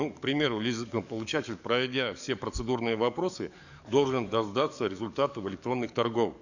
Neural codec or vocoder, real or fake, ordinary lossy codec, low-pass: codec, 44.1 kHz, 7.8 kbps, DAC; fake; none; 7.2 kHz